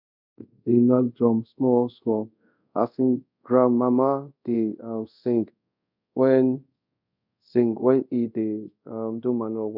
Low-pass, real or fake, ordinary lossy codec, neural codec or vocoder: 5.4 kHz; fake; none; codec, 24 kHz, 0.5 kbps, DualCodec